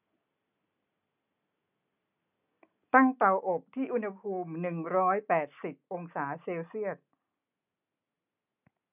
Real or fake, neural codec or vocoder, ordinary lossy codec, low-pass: real; none; none; 3.6 kHz